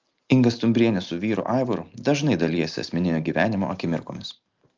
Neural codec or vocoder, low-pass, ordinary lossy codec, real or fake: none; 7.2 kHz; Opus, 24 kbps; real